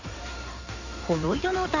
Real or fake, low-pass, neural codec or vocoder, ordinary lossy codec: fake; 7.2 kHz; codec, 16 kHz in and 24 kHz out, 2.2 kbps, FireRedTTS-2 codec; none